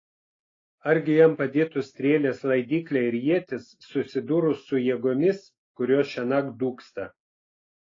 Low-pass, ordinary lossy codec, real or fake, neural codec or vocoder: 7.2 kHz; AAC, 32 kbps; real; none